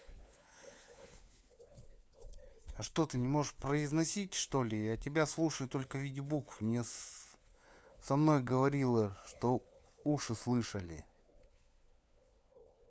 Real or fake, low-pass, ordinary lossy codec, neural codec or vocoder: fake; none; none; codec, 16 kHz, 4 kbps, FunCodec, trained on LibriTTS, 50 frames a second